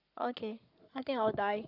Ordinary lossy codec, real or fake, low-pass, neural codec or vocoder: none; fake; 5.4 kHz; codec, 44.1 kHz, 7.8 kbps, Pupu-Codec